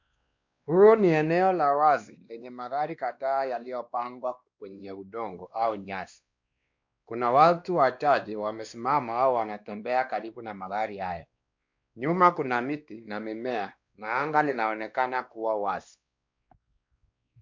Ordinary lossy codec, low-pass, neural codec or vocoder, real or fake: MP3, 64 kbps; 7.2 kHz; codec, 16 kHz, 2 kbps, X-Codec, WavLM features, trained on Multilingual LibriSpeech; fake